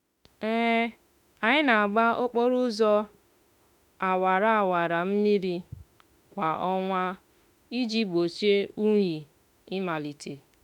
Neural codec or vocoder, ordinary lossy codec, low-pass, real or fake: autoencoder, 48 kHz, 32 numbers a frame, DAC-VAE, trained on Japanese speech; none; 19.8 kHz; fake